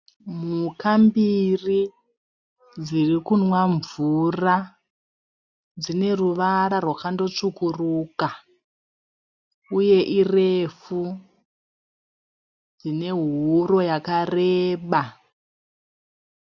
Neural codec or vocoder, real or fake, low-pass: none; real; 7.2 kHz